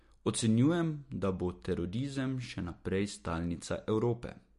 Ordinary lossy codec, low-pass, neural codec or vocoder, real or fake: MP3, 48 kbps; 14.4 kHz; none; real